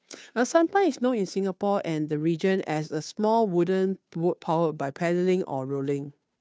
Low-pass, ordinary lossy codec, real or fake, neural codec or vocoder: none; none; fake; codec, 16 kHz, 2 kbps, FunCodec, trained on Chinese and English, 25 frames a second